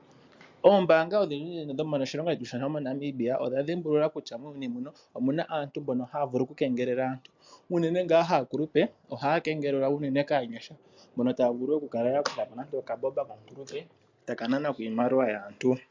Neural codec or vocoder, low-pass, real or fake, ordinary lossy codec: none; 7.2 kHz; real; MP3, 64 kbps